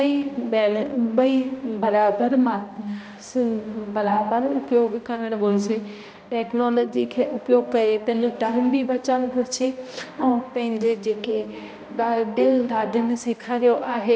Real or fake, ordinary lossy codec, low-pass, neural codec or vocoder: fake; none; none; codec, 16 kHz, 1 kbps, X-Codec, HuBERT features, trained on balanced general audio